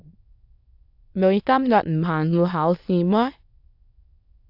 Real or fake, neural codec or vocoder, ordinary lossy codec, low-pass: fake; autoencoder, 22.05 kHz, a latent of 192 numbers a frame, VITS, trained on many speakers; AAC, 48 kbps; 5.4 kHz